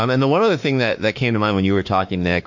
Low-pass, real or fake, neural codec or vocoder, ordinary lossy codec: 7.2 kHz; fake; autoencoder, 48 kHz, 32 numbers a frame, DAC-VAE, trained on Japanese speech; MP3, 48 kbps